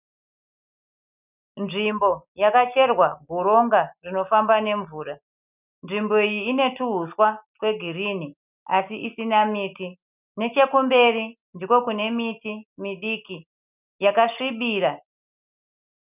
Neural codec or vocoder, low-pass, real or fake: none; 3.6 kHz; real